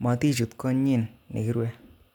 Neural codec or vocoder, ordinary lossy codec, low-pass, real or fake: none; none; 19.8 kHz; real